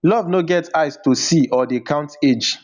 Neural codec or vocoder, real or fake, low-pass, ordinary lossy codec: none; real; 7.2 kHz; none